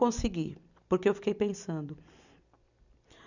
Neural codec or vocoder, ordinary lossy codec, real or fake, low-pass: none; none; real; 7.2 kHz